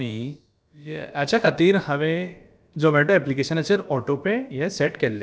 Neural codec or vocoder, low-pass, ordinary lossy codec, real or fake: codec, 16 kHz, about 1 kbps, DyCAST, with the encoder's durations; none; none; fake